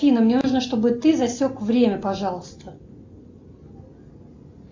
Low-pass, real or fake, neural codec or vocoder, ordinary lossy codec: 7.2 kHz; real; none; AAC, 48 kbps